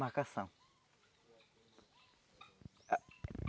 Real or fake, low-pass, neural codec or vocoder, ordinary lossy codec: real; none; none; none